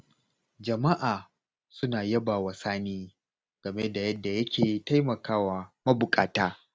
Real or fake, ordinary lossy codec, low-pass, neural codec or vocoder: real; none; none; none